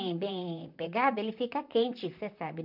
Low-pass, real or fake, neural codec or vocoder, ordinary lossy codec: 5.4 kHz; fake; vocoder, 44.1 kHz, 128 mel bands, Pupu-Vocoder; none